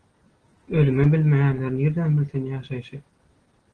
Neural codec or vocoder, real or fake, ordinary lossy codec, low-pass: none; real; Opus, 16 kbps; 9.9 kHz